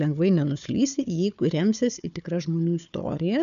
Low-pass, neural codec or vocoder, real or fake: 7.2 kHz; codec, 16 kHz, 4 kbps, FunCodec, trained on Chinese and English, 50 frames a second; fake